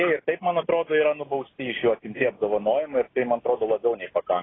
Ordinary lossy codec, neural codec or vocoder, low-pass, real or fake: AAC, 16 kbps; none; 7.2 kHz; real